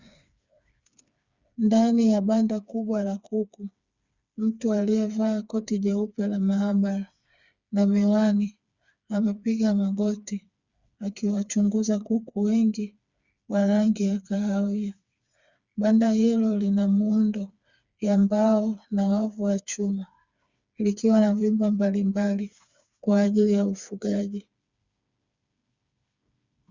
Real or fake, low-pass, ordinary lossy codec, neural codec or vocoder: fake; 7.2 kHz; Opus, 64 kbps; codec, 16 kHz, 4 kbps, FreqCodec, smaller model